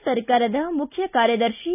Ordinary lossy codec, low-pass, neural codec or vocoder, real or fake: none; 3.6 kHz; none; real